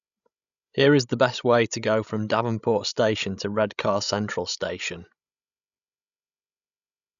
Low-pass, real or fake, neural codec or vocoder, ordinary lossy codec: 7.2 kHz; fake; codec, 16 kHz, 16 kbps, FreqCodec, larger model; none